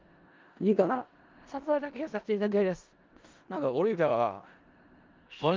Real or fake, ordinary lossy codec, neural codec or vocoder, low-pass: fake; Opus, 32 kbps; codec, 16 kHz in and 24 kHz out, 0.4 kbps, LongCat-Audio-Codec, four codebook decoder; 7.2 kHz